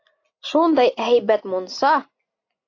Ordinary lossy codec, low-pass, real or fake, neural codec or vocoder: AAC, 48 kbps; 7.2 kHz; real; none